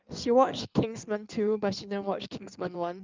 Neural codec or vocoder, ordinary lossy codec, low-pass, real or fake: codec, 16 kHz in and 24 kHz out, 1.1 kbps, FireRedTTS-2 codec; Opus, 24 kbps; 7.2 kHz; fake